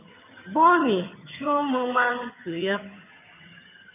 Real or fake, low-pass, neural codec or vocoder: fake; 3.6 kHz; vocoder, 22.05 kHz, 80 mel bands, HiFi-GAN